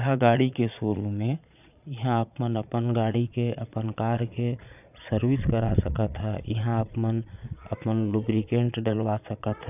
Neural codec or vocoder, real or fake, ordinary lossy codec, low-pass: vocoder, 22.05 kHz, 80 mel bands, Vocos; fake; none; 3.6 kHz